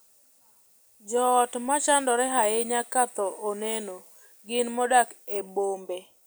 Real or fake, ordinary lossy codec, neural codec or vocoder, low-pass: real; none; none; none